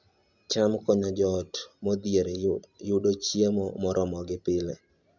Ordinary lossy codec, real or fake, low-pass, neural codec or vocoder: none; real; 7.2 kHz; none